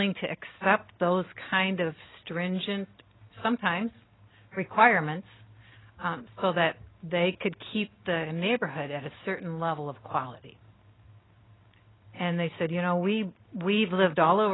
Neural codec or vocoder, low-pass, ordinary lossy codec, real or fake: none; 7.2 kHz; AAC, 16 kbps; real